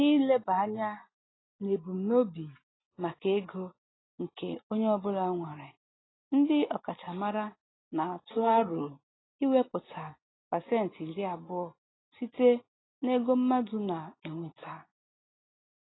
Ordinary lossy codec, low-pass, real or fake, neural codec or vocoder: AAC, 16 kbps; 7.2 kHz; real; none